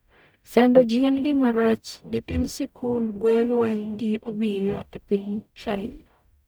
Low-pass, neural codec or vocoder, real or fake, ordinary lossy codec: none; codec, 44.1 kHz, 0.9 kbps, DAC; fake; none